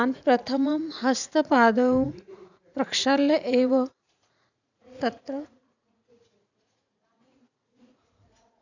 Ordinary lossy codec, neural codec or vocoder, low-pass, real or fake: none; vocoder, 44.1 kHz, 80 mel bands, Vocos; 7.2 kHz; fake